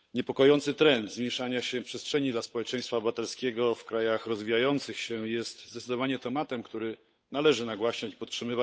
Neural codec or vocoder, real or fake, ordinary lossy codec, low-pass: codec, 16 kHz, 8 kbps, FunCodec, trained on Chinese and English, 25 frames a second; fake; none; none